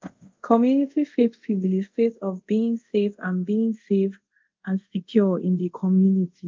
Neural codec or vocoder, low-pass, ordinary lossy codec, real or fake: codec, 24 kHz, 0.5 kbps, DualCodec; 7.2 kHz; Opus, 32 kbps; fake